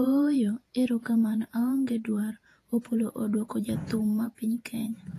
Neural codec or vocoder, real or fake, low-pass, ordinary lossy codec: vocoder, 48 kHz, 128 mel bands, Vocos; fake; 14.4 kHz; AAC, 48 kbps